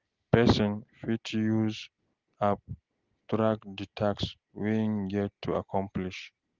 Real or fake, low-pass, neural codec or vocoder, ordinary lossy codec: real; 7.2 kHz; none; Opus, 16 kbps